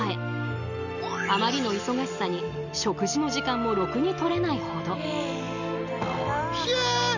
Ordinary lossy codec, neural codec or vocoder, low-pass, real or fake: none; none; 7.2 kHz; real